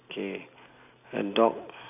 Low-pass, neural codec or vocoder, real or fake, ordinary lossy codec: 3.6 kHz; none; real; none